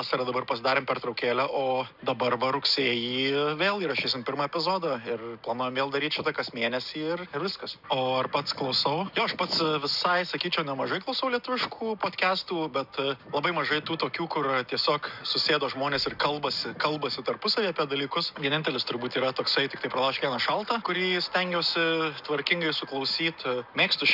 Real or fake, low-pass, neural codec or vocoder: real; 5.4 kHz; none